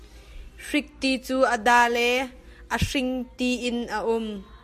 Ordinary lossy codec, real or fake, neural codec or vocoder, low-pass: MP3, 64 kbps; real; none; 14.4 kHz